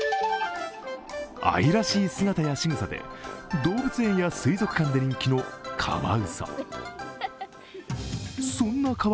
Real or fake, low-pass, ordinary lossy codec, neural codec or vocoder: real; none; none; none